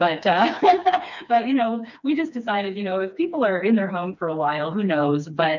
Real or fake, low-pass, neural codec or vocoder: fake; 7.2 kHz; codec, 16 kHz, 2 kbps, FreqCodec, smaller model